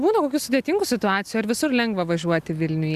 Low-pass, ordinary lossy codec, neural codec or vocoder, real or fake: 14.4 kHz; Opus, 64 kbps; none; real